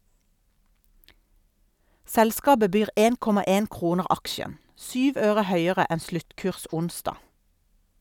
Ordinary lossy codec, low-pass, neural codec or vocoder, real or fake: none; 19.8 kHz; none; real